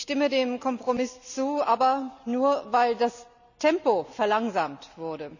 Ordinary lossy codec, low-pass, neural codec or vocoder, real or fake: none; 7.2 kHz; none; real